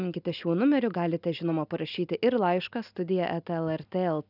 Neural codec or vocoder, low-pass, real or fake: none; 5.4 kHz; real